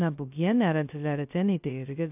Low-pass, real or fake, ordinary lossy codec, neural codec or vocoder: 3.6 kHz; fake; AAC, 32 kbps; codec, 16 kHz, 0.2 kbps, FocalCodec